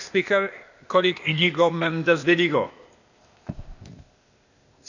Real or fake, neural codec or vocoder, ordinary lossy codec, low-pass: fake; codec, 16 kHz, 0.8 kbps, ZipCodec; none; 7.2 kHz